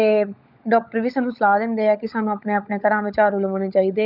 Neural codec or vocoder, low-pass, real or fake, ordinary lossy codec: codec, 16 kHz, 16 kbps, FunCodec, trained on LibriTTS, 50 frames a second; 5.4 kHz; fake; none